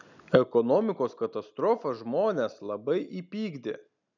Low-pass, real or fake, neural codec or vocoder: 7.2 kHz; real; none